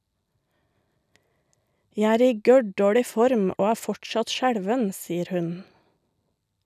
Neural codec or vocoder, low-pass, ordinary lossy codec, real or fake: none; 14.4 kHz; none; real